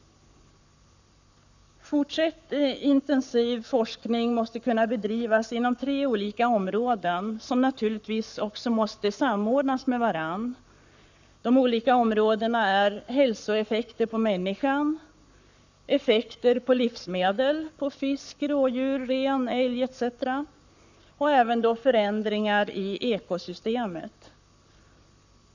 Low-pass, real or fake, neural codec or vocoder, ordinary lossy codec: 7.2 kHz; fake; codec, 44.1 kHz, 7.8 kbps, Pupu-Codec; none